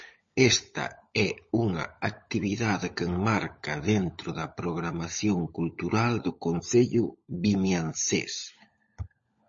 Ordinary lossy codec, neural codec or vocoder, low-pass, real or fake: MP3, 32 kbps; codec, 16 kHz, 16 kbps, FunCodec, trained on LibriTTS, 50 frames a second; 7.2 kHz; fake